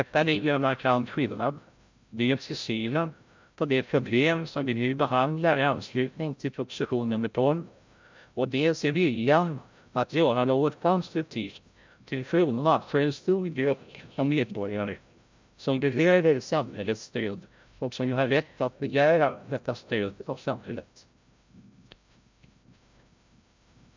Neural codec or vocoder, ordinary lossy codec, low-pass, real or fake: codec, 16 kHz, 0.5 kbps, FreqCodec, larger model; MP3, 64 kbps; 7.2 kHz; fake